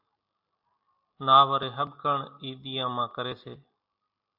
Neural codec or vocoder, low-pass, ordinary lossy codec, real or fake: none; 5.4 kHz; AAC, 48 kbps; real